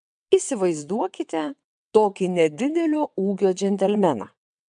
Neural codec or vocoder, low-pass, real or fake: vocoder, 22.05 kHz, 80 mel bands, WaveNeXt; 9.9 kHz; fake